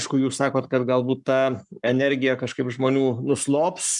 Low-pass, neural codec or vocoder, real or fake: 10.8 kHz; codec, 44.1 kHz, 7.8 kbps, Pupu-Codec; fake